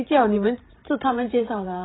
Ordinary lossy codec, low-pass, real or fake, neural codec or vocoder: AAC, 16 kbps; 7.2 kHz; fake; codec, 16 kHz, 16 kbps, FreqCodec, larger model